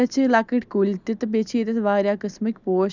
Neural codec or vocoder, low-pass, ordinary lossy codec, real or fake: none; 7.2 kHz; none; real